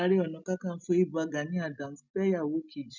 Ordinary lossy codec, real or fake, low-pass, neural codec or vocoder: none; real; 7.2 kHz; none